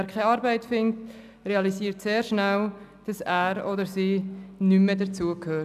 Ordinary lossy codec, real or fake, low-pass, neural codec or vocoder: none; real; 14.4 kHz; none